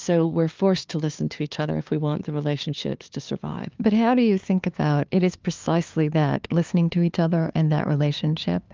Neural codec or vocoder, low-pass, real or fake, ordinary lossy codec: codec, 16 kHz, 2 kbps, FunCodec, trained on LibriTTS, 25 frames a second; 7.2 kHz; fake; Opus, 24 kbps